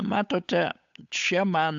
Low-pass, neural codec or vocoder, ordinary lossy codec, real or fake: 7.2 kHz; codec, 16 kHz, 8 kbps, FunCodec, trained on Chinese and English, 25 frames a second; AAC, 64 kbps; fake